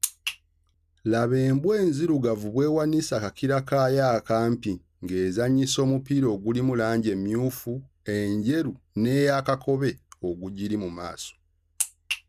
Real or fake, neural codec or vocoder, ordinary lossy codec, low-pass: real; none; none; 14.4 kHz